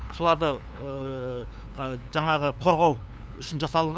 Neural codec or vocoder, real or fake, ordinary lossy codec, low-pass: codec, 16 kHz, 2 kbps, FunCodec, trained on LibriTTS, 25 frames a second; fake; none; none